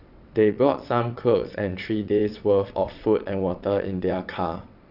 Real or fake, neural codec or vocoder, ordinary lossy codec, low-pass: fake; vocoder, 22.05 kHz, 80 mel bands, WaveNeXt; none; 5.4 kHz